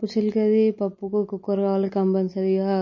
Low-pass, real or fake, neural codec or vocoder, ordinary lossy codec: 7.2 kHz; real; none; MP3, 32 kbps